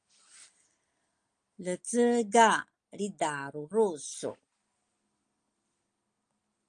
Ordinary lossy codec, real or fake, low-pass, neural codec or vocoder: Opus, 32 kbps; real; 9.9 kHz; none